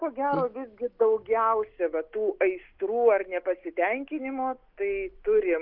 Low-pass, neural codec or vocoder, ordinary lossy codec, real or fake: 5.4 kHz; none; Opus, 32 kbps; real